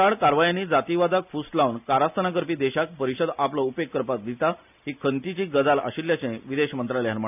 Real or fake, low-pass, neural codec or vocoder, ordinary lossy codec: real; 3.6 kHz; none; none